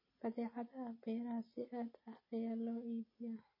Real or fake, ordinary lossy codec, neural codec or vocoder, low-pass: real; MP3, 24 kbps; none; 5.4 kHz